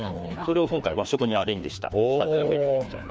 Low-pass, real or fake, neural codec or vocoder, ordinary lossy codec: none; fake; codec, 16 kHz, 2 kbps, FreqCodec, larger model; none